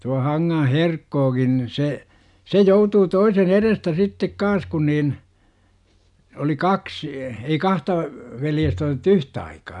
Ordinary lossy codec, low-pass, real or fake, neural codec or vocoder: none; 10.8 kHz; real; none